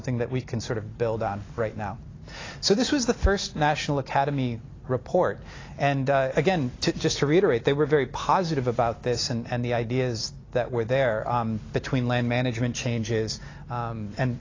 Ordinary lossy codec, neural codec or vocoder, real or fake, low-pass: AAC, 32 kbps; none; real; 7.2 kHz